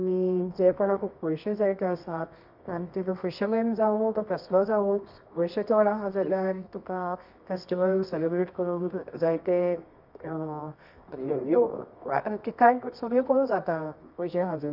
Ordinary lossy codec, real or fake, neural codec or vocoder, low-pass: none; fake; codec, 24 kHz, 0.9 kbps, WavTokenizer, medium music audio release; 5.4 kHz